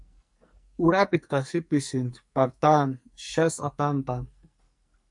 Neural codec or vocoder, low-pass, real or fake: codec, 44.1 kHz, 2.6 kbps, SNAC; 10.8 kHz; fake